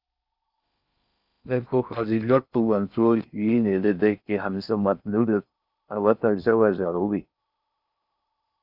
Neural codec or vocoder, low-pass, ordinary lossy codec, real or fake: codec, 16 kHz in and 24 kHz out, 0.6 kbps, FocalCodec, streaming, 4096 codes; 5.4 kHz; Opus, 64 kbps; fake